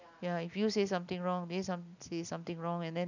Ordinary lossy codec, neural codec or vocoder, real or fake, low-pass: none; none; real; 7.2 kHz